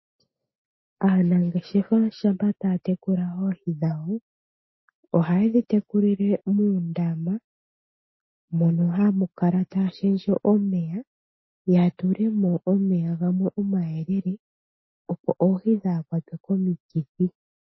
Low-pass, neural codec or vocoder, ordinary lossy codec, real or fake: 7.2 kHz; none; MP3, 24 kbps; real